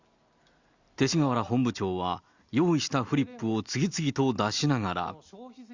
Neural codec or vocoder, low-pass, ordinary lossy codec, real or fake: none; 7.2 kHz; Opus, 64 kbps; real